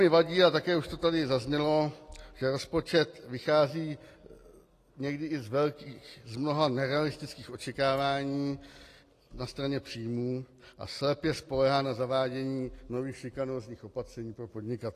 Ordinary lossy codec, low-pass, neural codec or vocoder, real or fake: AAC, 48 kbps; 14.4 kHz; none; real